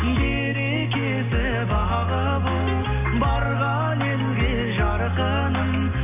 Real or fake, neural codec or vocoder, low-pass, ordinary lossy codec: real; none; 3.6 kHz; none